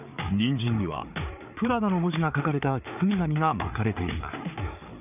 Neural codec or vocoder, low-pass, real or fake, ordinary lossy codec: codec, 16 kHz, 4 kbps, FreqCodec, larger model; 3.6 kHz; fake; none